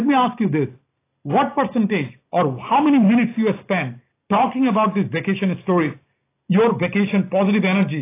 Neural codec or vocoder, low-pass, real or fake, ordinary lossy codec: none; 3.6 kHz; real; AAC, 24 kbps